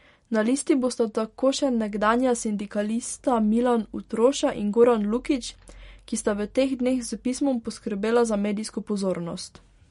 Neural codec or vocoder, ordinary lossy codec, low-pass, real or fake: none; MP3, 48 kbps; 10.8 kHz; real